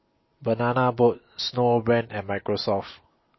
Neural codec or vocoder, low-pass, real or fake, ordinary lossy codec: none; 7.2 kHz; real; MP3, 24 kbps